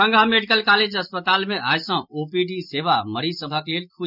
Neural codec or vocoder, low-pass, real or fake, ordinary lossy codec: none; 5.4 kHz; real; none